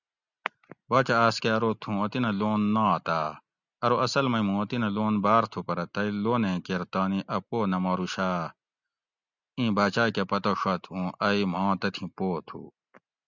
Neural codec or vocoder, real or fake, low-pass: none; real; 7.2 kHz